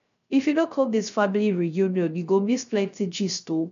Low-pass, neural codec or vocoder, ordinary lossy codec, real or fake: 7.2 kHz; codec, 16 kHz, 0.3 kbps, FocalCodec; MP3, 96 kbps; fake